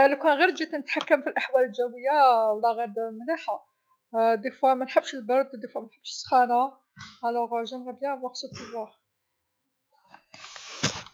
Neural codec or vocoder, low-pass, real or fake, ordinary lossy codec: autoencoder, 48 kHz, 128 numbers a frame, DAC-VAE, trained on Japanese speech; none; fake; none